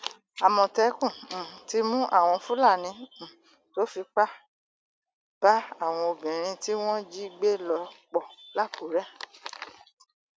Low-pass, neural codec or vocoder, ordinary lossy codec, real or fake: none; none; none; real